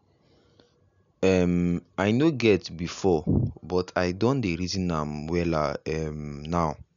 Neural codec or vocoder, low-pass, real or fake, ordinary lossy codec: none; 7.2 kHz; real; MP3, 96 kbps